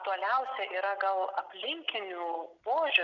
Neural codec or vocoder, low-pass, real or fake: none; 7.2 kHz; real